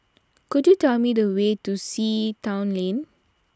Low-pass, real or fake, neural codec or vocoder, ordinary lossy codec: none; real; none; none